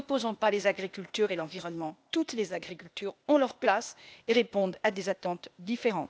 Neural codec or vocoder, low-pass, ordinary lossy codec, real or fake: codec, 16 kHz, 0.8 kbps, ZipCodec; none; none; fake